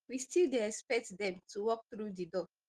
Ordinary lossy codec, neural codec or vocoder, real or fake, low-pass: Opus, 16 kbps; none; real; 10.8 kHz